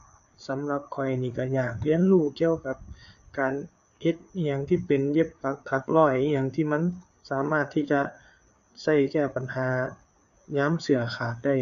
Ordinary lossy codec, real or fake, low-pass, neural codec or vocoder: none; fake; 7.2 kHz; codec, 16 kHz, 4 kbps, FreqCodec, larger model